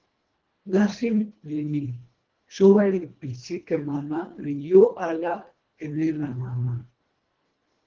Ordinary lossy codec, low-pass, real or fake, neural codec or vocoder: Opus, 16 kbps; 7.2 kHz; fake; codec, 24 kHz, 1.5 kbps, HILCodec